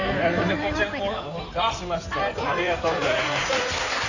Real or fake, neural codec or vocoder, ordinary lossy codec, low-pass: fake; codec, 16 kHz in and 24 kHz out, 2.2 kbps, FireRedTTS-2 codec; none; 7.2 kHz